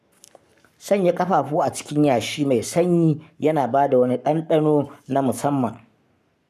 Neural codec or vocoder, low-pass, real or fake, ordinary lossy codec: codec, 44.1 kHz, 7.8 kbps, Pupu-Codec; 14.4 kHz; fake; none